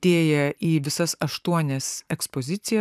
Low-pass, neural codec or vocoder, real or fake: 14.4 kHz; vocoder, 44.1 kHz, 128 mel bands every 256 samples, BigVGAN v2; fake